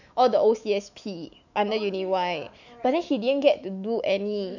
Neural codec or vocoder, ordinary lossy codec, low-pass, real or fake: autoencoder, 48 kHz, 128 numbers a frame, DAC-VAE, trained on Japanese speech; none; 7.2 kHz; fake